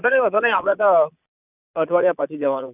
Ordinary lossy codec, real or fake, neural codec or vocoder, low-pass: none; fake; vocoder, 22.05 kHz, 80 mel bands, Vocos; 3.6 kHz